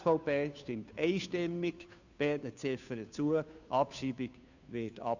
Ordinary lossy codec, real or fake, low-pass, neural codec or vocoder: none; fake; 7.2 kHz; codec, 16 kHz, 2 kbps, FunCodec, trained on Chinese and English, 25 frames a second